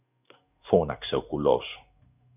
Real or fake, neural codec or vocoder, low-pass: fake; codec, 16 kHz in and 24 kHz out, 1 kbps, XY-Tokenizer; 3.6 kHz